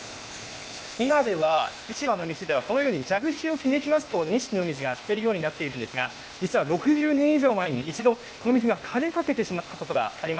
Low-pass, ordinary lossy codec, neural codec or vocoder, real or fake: none; none; codec, 16 kHz, 0.8 kbps, ZipCodec; fake